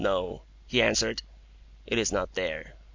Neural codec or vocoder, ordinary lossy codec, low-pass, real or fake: none; MP3, 64 kbps; 7.2 kHz; real